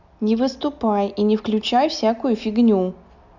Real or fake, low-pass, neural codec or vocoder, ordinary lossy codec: real; 7.2 kHz; none; none